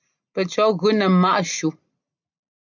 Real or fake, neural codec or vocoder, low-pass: real; none; 7.2 kHz